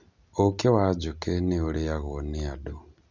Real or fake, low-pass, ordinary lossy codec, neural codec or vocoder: real; 7.2 kHz; none; none